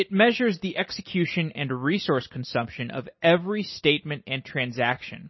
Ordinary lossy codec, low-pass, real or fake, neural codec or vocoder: MP3, 24 kbps; 7.2 kHz; real; none